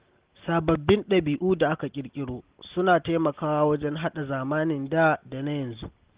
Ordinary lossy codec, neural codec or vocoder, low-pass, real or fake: Opus, 16 kbps; none; 3.6 kHz; real